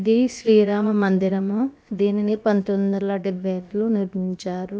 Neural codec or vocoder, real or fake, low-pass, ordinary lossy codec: codec, 16 kHz, about 1 kbps, DyCAST, with the encoder's durations; fake; none; none